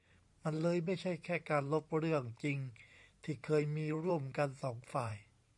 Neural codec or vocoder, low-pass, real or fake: vocoder, 44.1 kHz, 128 mel bands every 512 samples, BigVGAN v2; 10.8 kHz; fake